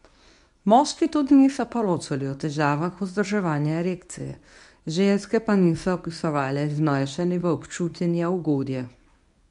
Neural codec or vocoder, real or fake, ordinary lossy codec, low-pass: codec, 24 kHz, 0.9 kbps, WavTokenizer, medium speech release version 1; fake; none; 10.8 kHz